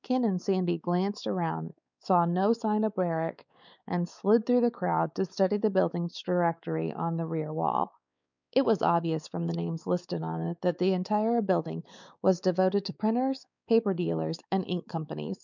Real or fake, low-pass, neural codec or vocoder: fake; 7.2 kHz; codec, 16 kHz, 4 kbps, X-Codec, WavLM features, trained on Multilingual LibriSpeech